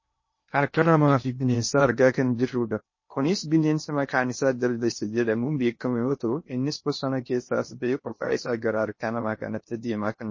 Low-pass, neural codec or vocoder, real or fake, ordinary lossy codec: 7.2 kHz; codec, 16 kHz in and 24 kHz out, 0.6 kbps, FocalCodec, streaming, 2048 codes; fake; MP3, 32 kbps